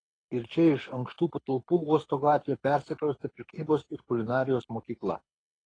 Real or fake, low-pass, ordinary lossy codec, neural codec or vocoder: fake; 9.9 kHz; AAC, 32 kbps; codec, 24 kHz, 6 kbps, HILCodec